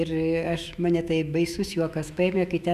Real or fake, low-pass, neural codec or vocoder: fake; 14.4 kHz; autoencoder, 48 kHz, 128 numbers a frame, DAC-VAE, trained on Japanese speech